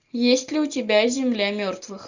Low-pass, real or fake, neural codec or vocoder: 7.2 kHz; real; none